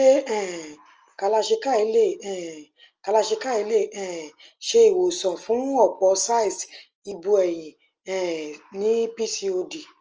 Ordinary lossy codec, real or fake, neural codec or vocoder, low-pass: Opus, 24 kbps; real; none; 7.2 kHz